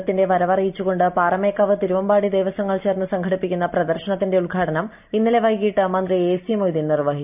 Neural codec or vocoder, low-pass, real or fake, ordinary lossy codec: none; 3.6 kHz; real; none